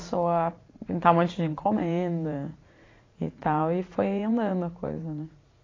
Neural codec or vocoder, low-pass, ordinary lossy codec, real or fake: vocoder, 44.1 kHz, 80 mel bands, Vocos; 7.2 kHz; AAC, 32 kbps; fake